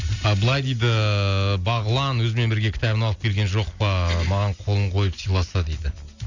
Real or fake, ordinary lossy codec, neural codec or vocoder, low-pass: real; Opus, 64 kbps; none; 7.2 kHz